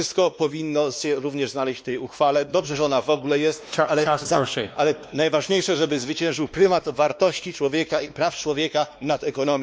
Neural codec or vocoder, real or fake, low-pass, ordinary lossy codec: codec, 16 kHz, 2 kbps, X-Codec, WavLM features, trained on Multilingual LibriSpeech; fake; none; none